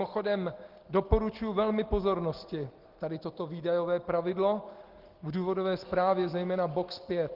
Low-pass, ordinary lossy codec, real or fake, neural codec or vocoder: 5.4 kHz; Opus, 16 kbps; real; none